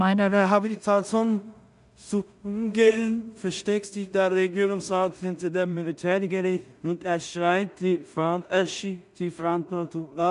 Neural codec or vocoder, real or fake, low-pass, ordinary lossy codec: codec, 16 kHz in and 24 kHz out, 0.4 kbps, LongCat-Audio-Codec, two codebook decoder; fake; 10.8 kHz; none